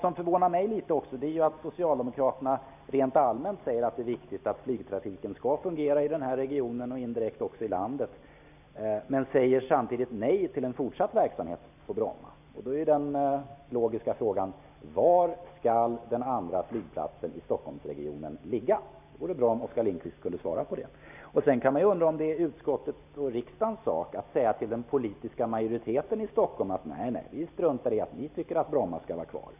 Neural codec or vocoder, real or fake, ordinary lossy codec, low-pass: none; real; none; 3.6 kHz